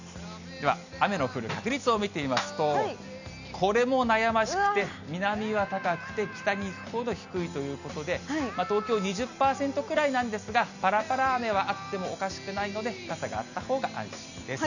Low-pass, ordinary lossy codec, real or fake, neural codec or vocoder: 7.2 kHz; none; real; none